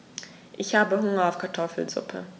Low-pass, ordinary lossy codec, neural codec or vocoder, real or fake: none; none; none; real